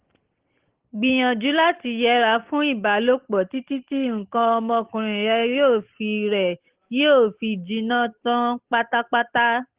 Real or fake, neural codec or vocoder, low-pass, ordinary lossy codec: real; none; 3.6 kHz; Opus, 16 kbps